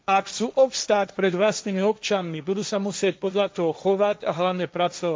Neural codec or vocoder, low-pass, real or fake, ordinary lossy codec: codec, 16 kHz, 1.1 kbps, Voila-Tokenizer; none; fake; none